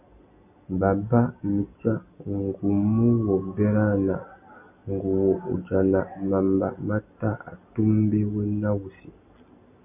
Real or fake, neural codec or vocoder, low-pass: real; none; 3.6 kHz